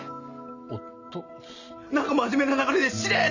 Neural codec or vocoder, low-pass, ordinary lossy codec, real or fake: none; 7.2 kHz; none; real